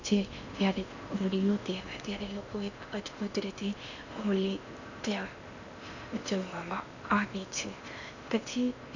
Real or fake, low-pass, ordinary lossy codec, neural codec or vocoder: fake; 7.2 kHz; none; codec, 16 kHz in and 24 kHz out, 0.8 kbps, FocalCodec, streaming, 65536 codes